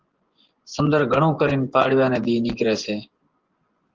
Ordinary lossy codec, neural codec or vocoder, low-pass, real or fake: Opus, 16 kbps; vocoder, 24 kHz, 100 mel bands, Vocos; 7.2 kHz; fake